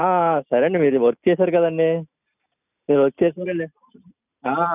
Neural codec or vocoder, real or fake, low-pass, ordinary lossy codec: none; real; 3.6 kHz; none